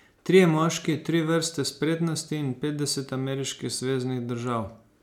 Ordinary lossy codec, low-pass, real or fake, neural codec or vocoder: none; 19.8 kHz; real; none